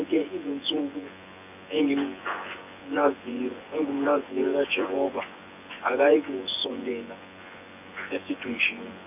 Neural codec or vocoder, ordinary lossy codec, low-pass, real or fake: vocoder, 24 kHz, 100 mel bands, Vocos; none; 3.6 kHz; fake